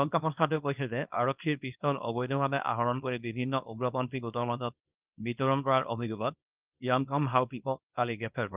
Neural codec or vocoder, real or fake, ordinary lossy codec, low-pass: codec, 24 kHz, 0.9 kbps, WavTokenizer, small release; fake; Opus, 24 kbps; 3.6 kHz